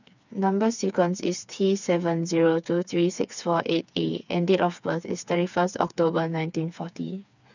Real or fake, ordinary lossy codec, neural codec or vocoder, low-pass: fake; none; codec, 16 kHz, 4 kbps, FreqCodec, smaller model; 7.2 kHz